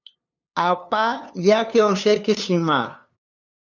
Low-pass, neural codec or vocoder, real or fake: 7.2 kHz; codec, 16 kHz, 2 kbps, FunCodec, trained on LibriTTS, 25 frames a second; fake